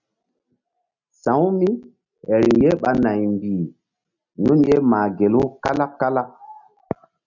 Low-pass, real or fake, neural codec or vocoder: 7.2 kHz; real; none